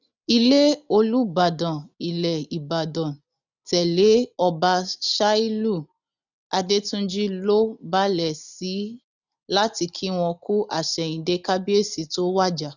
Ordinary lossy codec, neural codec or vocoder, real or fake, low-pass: none; none; real; 7.2 kHz